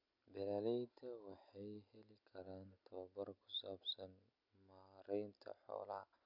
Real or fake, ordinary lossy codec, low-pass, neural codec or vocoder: real; MP3, 48 kbps; 5.4 kHz; none